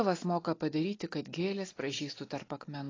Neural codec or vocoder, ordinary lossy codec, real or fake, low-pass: none; AAC, 32 kbps; real; 7.2 kHz